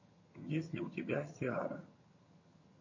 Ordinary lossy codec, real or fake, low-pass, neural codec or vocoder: MP3, 32 kbps; fake; 7.2 kHz; vocoder, 22.05 kHz, 80 mel bands, HiFi-GAN